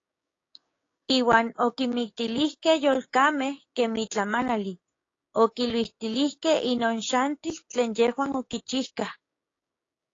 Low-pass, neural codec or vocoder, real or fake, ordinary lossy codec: 7.2 kHz; codec, 16 kHz, 6 kbps, DAC; fake; AAC, 32 kbps